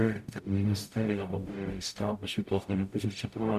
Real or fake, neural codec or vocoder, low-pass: fake; codec, 44.1 kHz, 0.9 kbps, DAC; 14.4 kHz